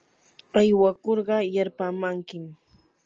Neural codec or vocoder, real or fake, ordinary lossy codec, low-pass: none; real; Opus, 24 kbps; 7.2 kHz